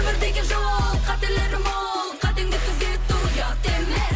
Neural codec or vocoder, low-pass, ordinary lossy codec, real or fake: none; none; none; real